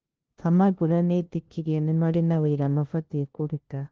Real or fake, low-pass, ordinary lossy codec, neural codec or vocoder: fake; 7.2 kHz; Opus, 16 kbps; codec, 16 kHz, 0.5 kbps, FunCodec, trained on LibriTTS, 25 frames a second